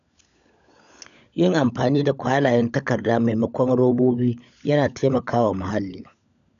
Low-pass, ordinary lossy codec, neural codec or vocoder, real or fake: 7.2 kHz; none; codec, 16 kHz, 16 kbps, FunCodec, trained on LibriTTS, 50 frames a second; fake